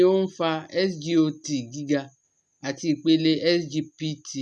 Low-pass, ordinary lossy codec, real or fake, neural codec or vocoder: none; none; real; none